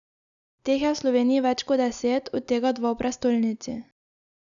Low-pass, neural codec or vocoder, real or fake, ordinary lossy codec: 7.2 kHz; none; real; none